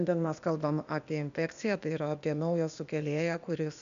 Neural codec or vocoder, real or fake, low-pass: codec, 16 kHz, 0.8 kbps, ZipCodec; fake; 7.2 kHz